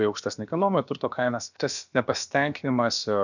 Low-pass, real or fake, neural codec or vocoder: 7.2 kHz; fake; codec, 16 kHz, about 1 kbps, DyCAST, with the encoder's durations